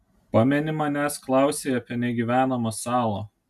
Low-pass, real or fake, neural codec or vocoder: 14.4 kHz; real; none